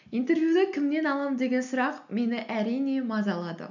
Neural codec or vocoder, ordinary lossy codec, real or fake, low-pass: none; none; real; 7.2 kHz